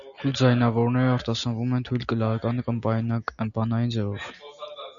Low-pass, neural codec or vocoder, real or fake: 7.2 kHz; none; real